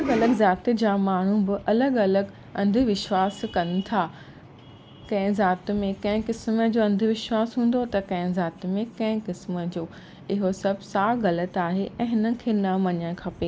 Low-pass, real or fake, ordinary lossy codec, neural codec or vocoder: none; real; none; none